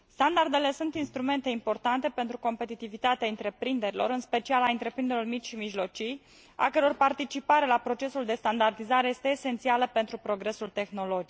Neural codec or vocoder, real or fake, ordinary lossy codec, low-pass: none; real; none; none